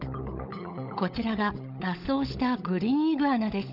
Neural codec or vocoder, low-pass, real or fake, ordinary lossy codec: codec, 16 kHz, 16 kbps, FunCodec, trained on LibriTTS, 50 frames a second; 5.4 kHz; fake; none